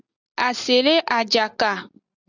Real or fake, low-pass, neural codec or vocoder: real; 7.2 kHz; none